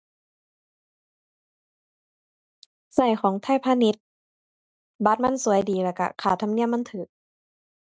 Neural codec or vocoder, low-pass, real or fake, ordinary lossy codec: none; none; real; none